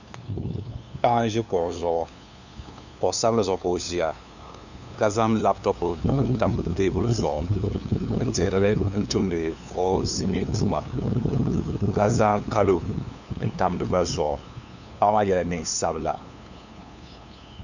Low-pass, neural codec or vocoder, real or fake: 7.2 kHz; codec, 16 kHz, 2 kbps, FunCodec, trained on LibriTTS, 25 frames a second; fake